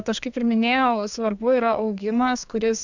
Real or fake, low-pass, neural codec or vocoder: fake; 7.2 kHz; codec, 32 kHz, 1.9 kbps, SNAC